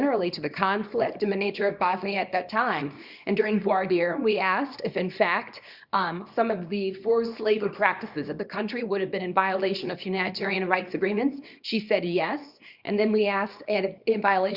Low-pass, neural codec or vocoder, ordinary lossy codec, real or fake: 5.4 kHz; codec, 24 kHz, 0.9 kbps, WavTokenizer, small release; Opus, 64 kbps; fake